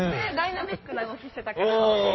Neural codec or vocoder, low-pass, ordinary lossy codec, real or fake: codec, 16 kHz in and 24 kHz out, 2.2 kbps, FireRedTTS-2 codec; 7.2 kHz; MP3, 24 kbps; fake